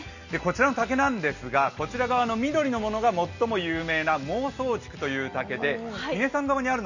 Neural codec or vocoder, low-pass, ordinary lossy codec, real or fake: none; 7.2 kHz; none; real